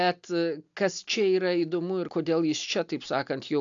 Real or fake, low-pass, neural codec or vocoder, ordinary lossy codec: real; 7.2 kHz; none; AAC, 64 kbps